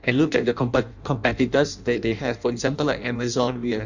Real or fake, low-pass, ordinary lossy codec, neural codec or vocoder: fake; 7.2 kHz; none; codec, 16 kHz in and 24 kHz out, 0.6 kbps, FireRedTTS-2 codec